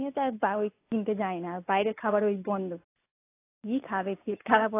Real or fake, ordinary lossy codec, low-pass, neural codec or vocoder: real; AAC, 24 kbps; 3.6 kHz; none